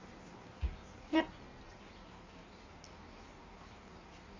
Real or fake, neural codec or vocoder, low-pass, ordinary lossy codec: fake; codec, 16 kHz in and 24 kHz out, 1.1 kbps, FireRedTTS-2 codec; 7.2 kHz; AAC, 32 kbps